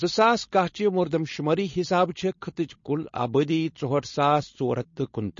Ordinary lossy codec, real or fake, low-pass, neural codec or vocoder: MP3, 32 kbps; fake; 7.2 kHz; codec, 16 kHz, 4.8 kbps, FACodec